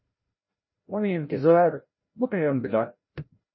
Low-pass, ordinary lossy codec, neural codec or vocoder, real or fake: 7.2 kHz; MP3, 24 kbps; codec, 16 kHz, 0.5 kbps, FreqCodec, larger model; fake